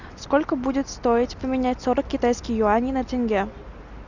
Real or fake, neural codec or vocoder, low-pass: real; none; 7.2 kHz